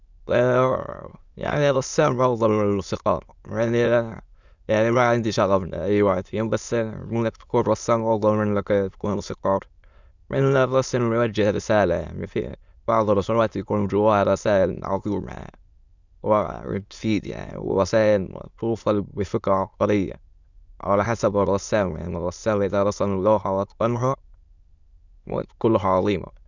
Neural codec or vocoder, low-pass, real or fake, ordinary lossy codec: autoencoder, 22.05 kHz, a latent of 192 numbers a frame, VITS, trained on many speakers; 7.2 kHz; fake; Opus, 64 kbps